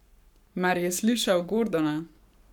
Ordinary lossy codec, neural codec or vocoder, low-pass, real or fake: none; codec, 44.1 kHz, 7.8 kbps, Pupu-Codec; 19.8 kHz; fake